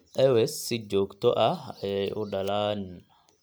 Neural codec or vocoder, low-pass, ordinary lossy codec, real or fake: none; none; none; real